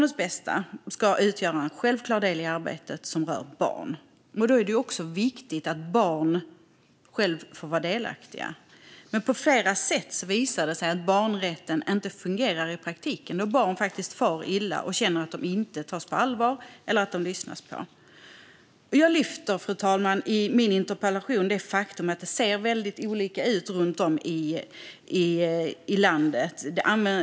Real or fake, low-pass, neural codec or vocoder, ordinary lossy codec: real; none; none; none